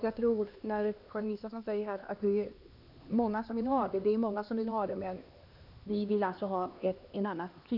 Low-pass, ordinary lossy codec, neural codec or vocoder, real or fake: 5.4 kHz; none; codec, 16 kHz, 2 kbps, X-Codec, HuBERT features, trained on LibriSpeech; fake